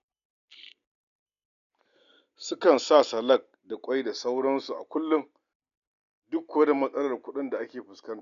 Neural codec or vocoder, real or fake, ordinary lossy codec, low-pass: none; real; none; 7.2 kHz